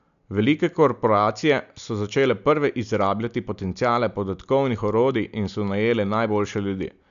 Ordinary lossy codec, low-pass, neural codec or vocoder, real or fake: none; 7.2 kHz; none; real